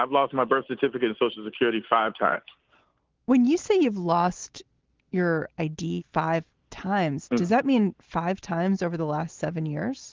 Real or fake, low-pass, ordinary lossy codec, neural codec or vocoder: real; 7.2 kHz; Opus, 16 kbps; none